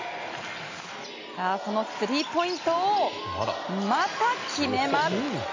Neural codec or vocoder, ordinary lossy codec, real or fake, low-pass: none; MP3, 48 kbps; real; 7.2 kHz